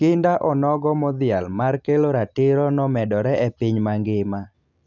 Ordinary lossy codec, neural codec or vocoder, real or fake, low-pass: none; none; real; 7.2 kHz